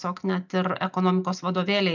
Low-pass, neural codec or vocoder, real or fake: 7.2 kHz; none; real